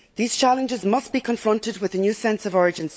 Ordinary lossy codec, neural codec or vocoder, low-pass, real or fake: none; codec, 16 kHz, 16 kbps, FunCodec, trained on LibriTTS, 50 frames a second; none; fake